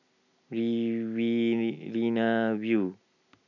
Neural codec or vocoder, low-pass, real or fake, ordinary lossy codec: none; 7.2 kHz; real; none